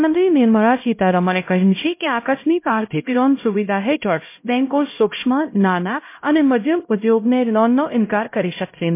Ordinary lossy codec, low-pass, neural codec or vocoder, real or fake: MP3, 24 kbps; 3.6 kHz; codec, 16 kHz, 0.5 kbps, X-Codec, HuBERT features, trained on LibriSpeech; fake